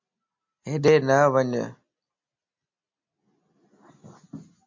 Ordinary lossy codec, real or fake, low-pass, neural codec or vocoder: MP3, 64 kbps; real; 7.2 kHz; none